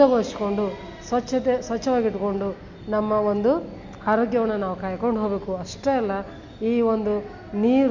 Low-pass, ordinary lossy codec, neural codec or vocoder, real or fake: 7.2 kHz; Opus, 64 kbps; none; real